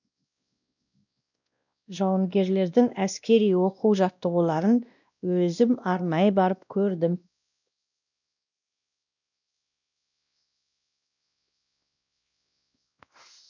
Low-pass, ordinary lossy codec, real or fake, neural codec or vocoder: 7.2 kHz; none; fake; codec, 16 kHz, 1 kbps, X-Codec, WavLM features, trained on Multilingual LibriSpeech